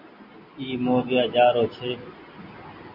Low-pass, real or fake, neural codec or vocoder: 5.4 kHz; real; none